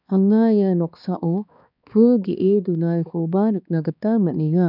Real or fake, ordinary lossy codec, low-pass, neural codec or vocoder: fake; none; 5.4 kHz; codec, 16 kHz, 2 kbps, X-Codec, HuBERT features, trained on balanced general audio